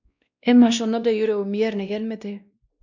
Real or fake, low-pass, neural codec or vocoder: fake; 7.2 kHz; codec, 16 kHz, 1 kbps, X-Codec, WavLM features, trained on Multilingual LibriSpeech